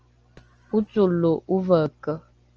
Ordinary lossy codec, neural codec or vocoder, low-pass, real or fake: Opus, 24 kbps; none; 7.2 kHz; real